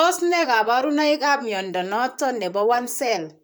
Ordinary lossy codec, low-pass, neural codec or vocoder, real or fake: none; none; vocoder, 44.1 kHz, 128 mel bands, Pupu-Vocoder; fake